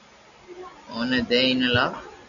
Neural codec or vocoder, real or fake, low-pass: none; real; 7.2 kHz